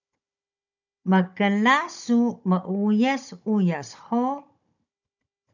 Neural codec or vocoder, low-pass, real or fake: codec, 16 kHz, 16 kbps, FunCodec, trained on Chinese and English, 50 frames a second; 7.2 kHz; fake